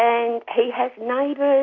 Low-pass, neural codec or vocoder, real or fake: 7.2 kHz; none; real